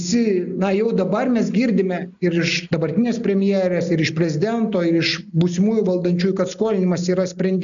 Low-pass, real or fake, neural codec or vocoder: 7.2 kHz; real; none